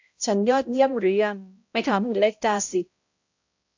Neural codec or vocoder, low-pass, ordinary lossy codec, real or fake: codec, 16 kHz, 0.5 kbps, X-Codec, HuBERT features, trained on balanced general audio; 7.2 kHz; AAC, 48 kbps; fake